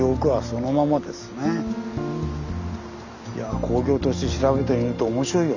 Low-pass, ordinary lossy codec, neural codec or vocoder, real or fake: 7.2 kHz; none; none; real